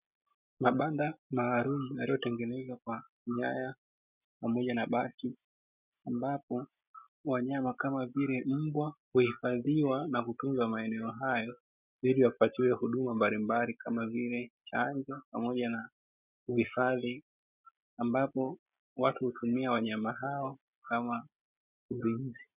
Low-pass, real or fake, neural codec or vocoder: 3.6 kHz; real; none